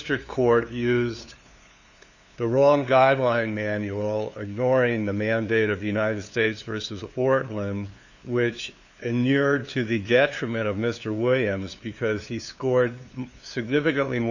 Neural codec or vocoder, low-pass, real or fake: codec, 16 kHz, 2 kbps, FunCodec, trained on LibriTTS, 25 frames a second; 7.2 kHz; fake